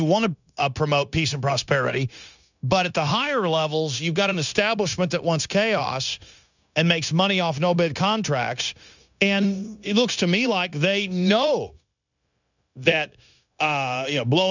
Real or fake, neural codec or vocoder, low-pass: fake; codec, 16 kHz, 0.9 kbps, LongCat-Audio-Codec; 7.2 kHz